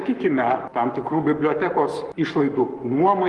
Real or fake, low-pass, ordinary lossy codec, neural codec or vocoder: fake; 10.8 kHz; Opus, 24 kbps; codec, 44.1 kHz, 7.8 kbps, Pupu-Codec